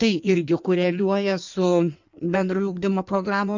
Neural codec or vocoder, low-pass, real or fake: codec, 16 kHz in and 24 kHz out, 1.1 kbps, FireRedTTS-2 codec; 7.2 kHz; fake